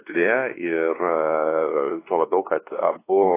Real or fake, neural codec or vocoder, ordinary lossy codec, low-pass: fake; codec, 16 kHz, 2 kbps, X-Codec, WavLM features, trained on Multilingual LibriSpeech; AAC, 16 kbps; 3.6 kHz